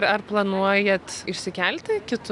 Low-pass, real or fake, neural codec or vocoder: 10.8 kHz; real; none